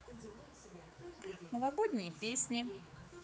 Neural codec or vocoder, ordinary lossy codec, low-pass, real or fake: codec, 16 kHz, 4 kbps, X-Codec, HuBERT features, trained on general audio; none; none; fake